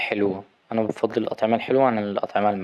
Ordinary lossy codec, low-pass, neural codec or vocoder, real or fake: none; none; none; real